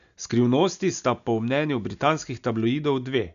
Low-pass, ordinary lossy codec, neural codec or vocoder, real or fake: 7.2 kHz; none; none; real